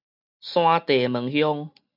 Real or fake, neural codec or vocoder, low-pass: real; none; 5.4 kHz